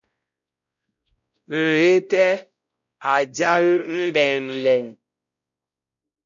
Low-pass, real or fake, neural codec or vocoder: 7.2 kHz; fake; codec, 16 kHz, 0.5 kbps, X-Codec, WavLM features, trained on Multilingual LibriSpeech